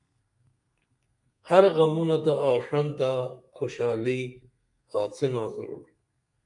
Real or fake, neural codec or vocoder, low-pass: fake; codec, 32 kHz, 1.9 kbps, SNAC; 10.8 kHz